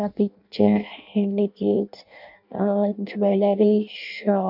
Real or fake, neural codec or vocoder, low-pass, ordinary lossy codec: fake; codec, 16 kHz in and 24 kHz out, 0.6 kbps, FireRedTTS-2 codec; 5.4 kHz; none